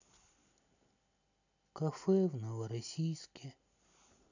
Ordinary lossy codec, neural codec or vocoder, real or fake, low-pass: none; none; real; 7.2 kHz